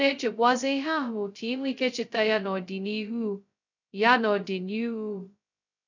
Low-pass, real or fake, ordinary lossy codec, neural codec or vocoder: 7.2 kHz; fake; none; codec, 16 kHz, 0.2 kbps, FocalCodec